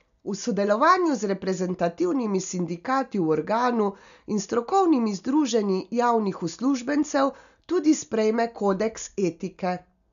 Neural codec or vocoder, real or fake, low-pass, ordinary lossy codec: none; real; 7.2 kHz; none